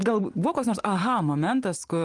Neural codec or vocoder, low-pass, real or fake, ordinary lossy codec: none; 10.8 kHz; real; Opus, 24 kbps